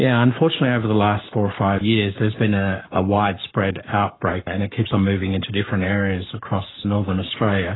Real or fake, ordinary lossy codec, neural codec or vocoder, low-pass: fake; AAC, 16 kbps; codec, 44.1 kHz, 7.8 kbps, Pupu-Codec; 7.2 kHz